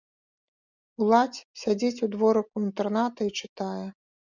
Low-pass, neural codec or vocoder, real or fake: 7.2 kHz; none; real